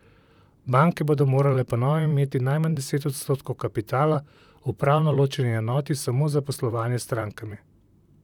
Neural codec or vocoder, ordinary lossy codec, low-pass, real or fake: vocoder, 44.1 kHz, 128 mel bands, Pupu-Vocoder; none; 19.8 kHz; fake